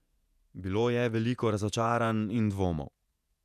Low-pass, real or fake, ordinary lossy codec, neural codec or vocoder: 14.4 kHz; fake; none; vocoder, 48 kHz, 128 mel bands, Vocos